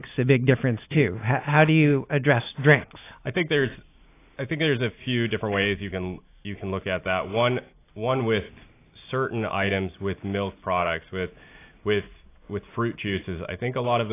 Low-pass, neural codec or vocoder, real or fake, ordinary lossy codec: 3.6 kHz; none; real; AAC, 24 kbps